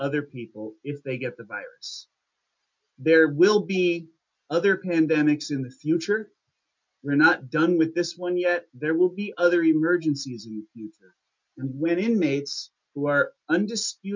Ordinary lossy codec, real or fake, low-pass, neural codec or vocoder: MP3, 64 kbps; real; 7.2 kHz; none